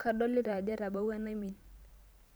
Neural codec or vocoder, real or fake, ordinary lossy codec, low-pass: vocoder, 44.1 kHz, 128 mel bands every 512 samples, BigVGAN v2; fake; none; none